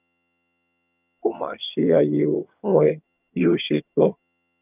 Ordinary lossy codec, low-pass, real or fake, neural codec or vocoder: none; 3.6 kHz; fake; vocoder, 22.05 kHz, 80 mel bands, HiFi-GAN